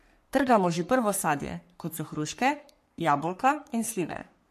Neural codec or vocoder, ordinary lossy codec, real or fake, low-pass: codec, 44.1 kHz, 3.4 kbps, Pupu-Codec; MP3, 64 kbps; fake; 14.4 kHz